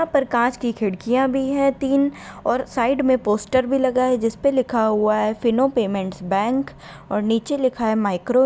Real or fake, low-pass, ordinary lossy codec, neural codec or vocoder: real; none; none; none